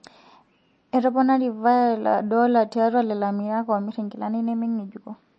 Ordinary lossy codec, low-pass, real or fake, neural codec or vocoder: MP3, 32 kbps; 9.9 kHz; real; none